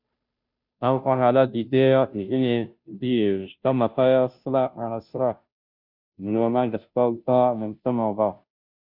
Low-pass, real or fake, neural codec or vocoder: 5.4 kHz; fake; codec, 16 kHz, 0.5 kbps, FunCodec, trained on Chinese and English, 25 frames a second